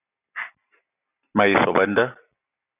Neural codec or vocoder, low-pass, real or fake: none; 3.6 kHz; real